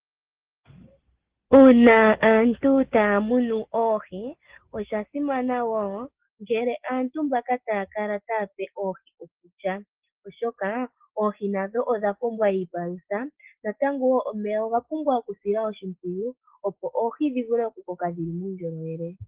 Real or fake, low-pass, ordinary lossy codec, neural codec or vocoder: real; 3.6 kHz; Opus, 16 kbps; none